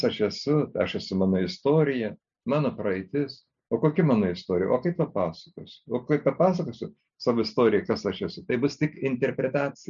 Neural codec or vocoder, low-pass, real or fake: none; 7.2 kHz; real